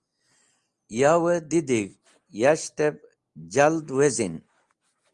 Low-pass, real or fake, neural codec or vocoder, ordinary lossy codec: 10.8 kHz; real; none; Opus, 32 kbps